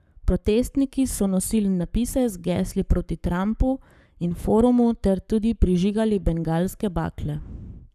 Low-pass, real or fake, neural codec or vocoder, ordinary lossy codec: 14.4 kHz; fake; codec, 44.1 kHz, 7.8 kbps, Pupu-Codec; none